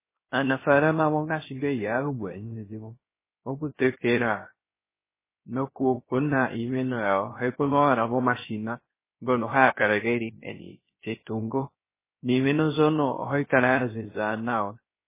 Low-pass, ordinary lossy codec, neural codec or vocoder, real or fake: 3.6 kHz; MP3, 16 kbps; codec, 16 kHz, 0.3 kbps, FocalCodec; fake